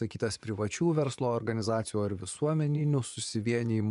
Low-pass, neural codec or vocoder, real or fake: 10.8 kHz; vocoder, 24 kHz, 100 mel bands, Vocos; fake